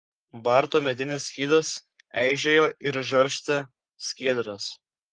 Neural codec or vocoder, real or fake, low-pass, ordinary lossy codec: codec, 44.1 kHz, 3.4 kbps, Pupu-Codec; fake; 9.9 kHz; Opus, 32 kbps